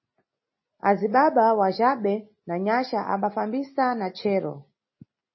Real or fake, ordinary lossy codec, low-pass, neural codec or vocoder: real; MP3, 24 kbps; 7.2 kHz; none